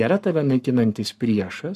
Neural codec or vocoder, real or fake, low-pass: codec, 44.1 kHz, 7.8 kbps, Pupu-Codec; fake; 14.4 kHz